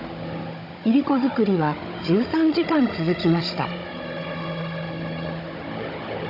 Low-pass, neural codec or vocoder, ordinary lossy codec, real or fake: 5.4 kHz; codec, 16 kHz, 16 kbps, FunCodec, trained on Chinese and English, 50 frames a second; none; fake